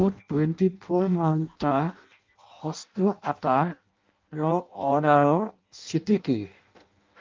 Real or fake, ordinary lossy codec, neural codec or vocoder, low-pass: fake; Opus, 32 kbps; codec, 16 kHz in and 24 kHz out, 0.6 kbps, FireRedTTS-2 codec; 7.2 kHz